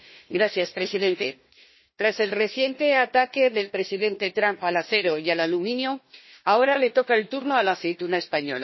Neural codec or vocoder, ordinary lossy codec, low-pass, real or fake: codec, 16 kHz, 1 kbps, FunCodec, trained on Chinese and English, 50 frames a second; MP3, 24 kbps; 7.2 kHz; fake